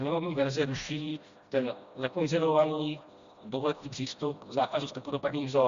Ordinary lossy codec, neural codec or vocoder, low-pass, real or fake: Opus, 64 kbps; codec, 16 kHz, 1 kbps, FreqCodec, smaller model; 7.2 kHz; fake